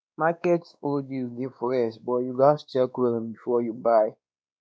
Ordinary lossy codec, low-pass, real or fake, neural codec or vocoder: none; none; fake; codec, 16 kHz, 2 kbps, X-Codec, WavLM features, trained on Multilingual LibriSpeech